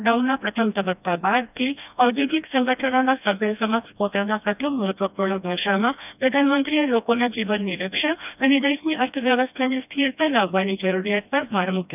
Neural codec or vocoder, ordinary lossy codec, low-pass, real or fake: codec, 16 kHz, 1 kbps, FreqCodec, smaller model; none; 3.6 kHz; fake